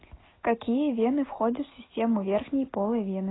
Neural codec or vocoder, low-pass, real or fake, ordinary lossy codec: none; 7.2 kHz; real; AAC, 16 kbps